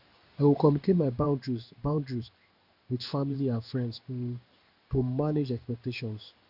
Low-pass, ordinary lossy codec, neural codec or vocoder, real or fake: 5.4 kHz; none; codec, 16 kHz in and 24 kHz out, 1 kbps, XY-Tokenizer; fake